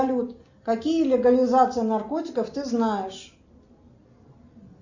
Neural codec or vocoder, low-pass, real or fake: none; 7.2 kHz; real